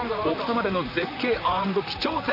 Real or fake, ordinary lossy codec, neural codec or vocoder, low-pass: fake; none; vocoder, 44.1 kHz, 128 mel bands, Pupu-Vocoder; 5.4 kHz